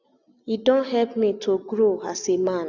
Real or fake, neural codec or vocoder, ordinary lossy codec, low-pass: real; none; none; 7.2 kHz